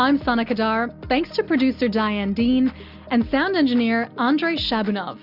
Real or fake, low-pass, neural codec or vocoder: real; 5.4 kHz; none